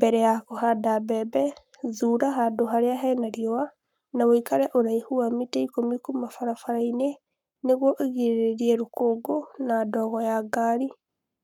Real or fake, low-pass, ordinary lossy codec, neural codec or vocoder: fake; 19.8 kHz; none; codec, 44.1 kHz, 7.8 kbps, Pupu-Codec